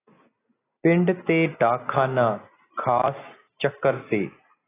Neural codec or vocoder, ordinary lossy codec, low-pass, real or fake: none; AAC, 16 kbps; 3.6 kHz; real